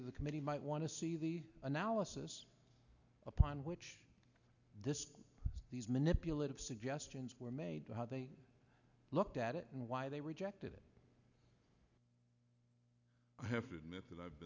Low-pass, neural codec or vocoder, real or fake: 7.2 kHz; none; real